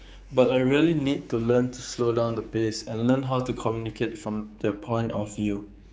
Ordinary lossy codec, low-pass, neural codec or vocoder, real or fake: none; none; codec, 16 kHz, 4 kbps, X-Codec, HuBERT features, trained on general audio; fake